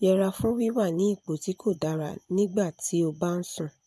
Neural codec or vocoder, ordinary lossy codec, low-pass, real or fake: vocoder, 24 kHz, 100 mel bands, Vocos; none; none; fake